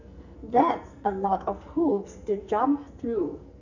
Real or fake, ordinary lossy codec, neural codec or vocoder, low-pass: fake; none; codec, 44.1 kHz, 2.6 kbps, SNAC; 7.2 kHz